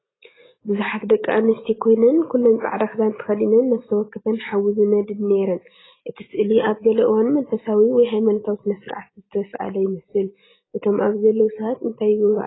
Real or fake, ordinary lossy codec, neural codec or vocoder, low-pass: real; AAC, 16 kbps; none; 7.2 kHz